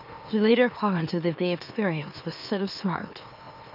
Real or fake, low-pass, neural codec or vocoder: fake; 5.4 kHz; autoencoder, 44.1 kHz, a latent of 192 numbers a frame, MeloTTS